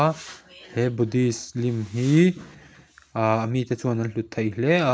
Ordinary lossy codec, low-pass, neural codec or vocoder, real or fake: none; none; none; real